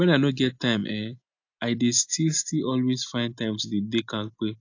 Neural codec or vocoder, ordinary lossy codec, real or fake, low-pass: none; AAC, 48 kbps; real; 7.2 kHz